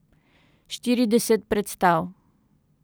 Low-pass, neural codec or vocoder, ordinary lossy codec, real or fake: none; none; none; real